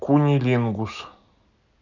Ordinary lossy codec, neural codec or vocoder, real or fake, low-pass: none; none; real; 7.2 kHz